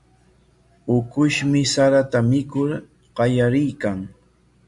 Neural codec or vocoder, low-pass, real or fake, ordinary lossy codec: none; 10.8 kHz; real; MP3, 64 kbps